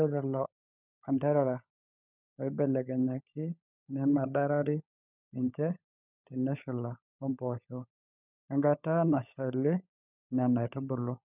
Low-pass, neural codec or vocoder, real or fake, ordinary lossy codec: 3.6 kHz; codec, 16 kHz, 16 kbps, FunCodec, trained on LibriTTS, 50 frames a second; fake; none